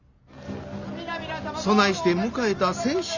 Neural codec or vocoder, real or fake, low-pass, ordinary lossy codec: none; real; 7.2 kHz; Opus, 64 kbps